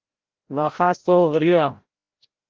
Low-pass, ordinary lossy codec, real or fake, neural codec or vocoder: 7.2 kHz; Opus, 16 kbps; fake; codec, 16 kHz, 0.5 kbps, FreqCodec, larger model